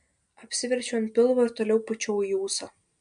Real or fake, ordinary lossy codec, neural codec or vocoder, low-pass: real; MP3, 64 kbps; none; 9.9 kHz